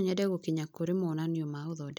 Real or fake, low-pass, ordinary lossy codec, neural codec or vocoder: real; none; none; none